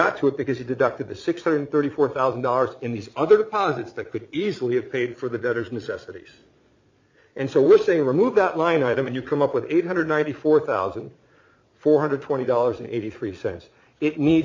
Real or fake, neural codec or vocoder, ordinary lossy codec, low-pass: fake; vocoder, 22.05 kHz, 80 mel bands, Vocos; MP3, 48 kbps; 7.2 kHz